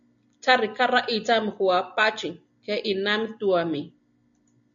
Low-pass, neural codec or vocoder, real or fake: 7.2 kHz; none; real